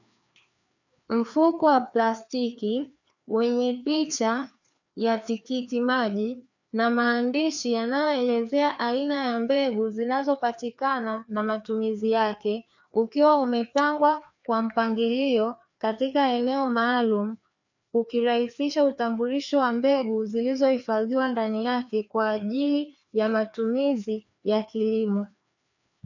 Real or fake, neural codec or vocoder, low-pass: fake; codec, 16 kHz, 2 kbps, FreqCodec, larger model; 7.2 kHz